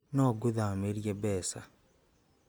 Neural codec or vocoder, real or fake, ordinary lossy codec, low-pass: none; real; none; none